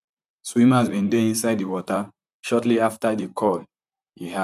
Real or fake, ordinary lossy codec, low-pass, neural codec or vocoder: fake; none; 14.4 kHz; vocoder, 44.1 kHz, 128 mel bands, Pupu-Vocoder